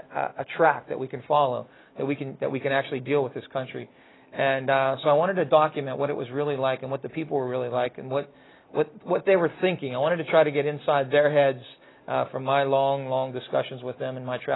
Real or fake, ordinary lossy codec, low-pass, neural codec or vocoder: real; AAC, 16 kbps; 7.2 kHz; none